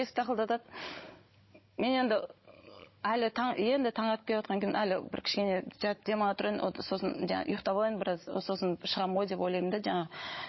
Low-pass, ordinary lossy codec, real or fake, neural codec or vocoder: 7.2 kHz; MP3, 24 kbps; fake; codec, 16 kHz, 16 kbps, FunCodec, trained on Chinese and English, 50 frames a second